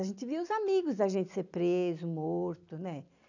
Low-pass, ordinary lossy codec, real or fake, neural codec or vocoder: 7.2 kHz; none; real; none